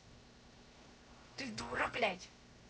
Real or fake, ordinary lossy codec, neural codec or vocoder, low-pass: fake; none; codec, 16 kHz, 0.7 kbps, FocalCodec; none